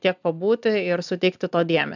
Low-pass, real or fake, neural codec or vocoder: 7.2 kHz; real; none